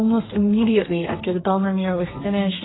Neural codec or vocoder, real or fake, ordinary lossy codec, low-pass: codec, 32 kHz, 1.9 kbps, SNAC; fake; AAC, 16 kbps; 7.2 kHz